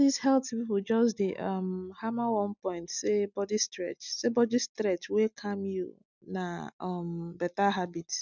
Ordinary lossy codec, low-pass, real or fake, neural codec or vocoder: none; 7.2 kHz; real; none